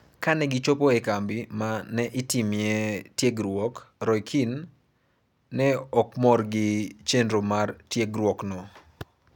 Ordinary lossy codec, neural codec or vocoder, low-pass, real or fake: none; none; 19.8 kHz; real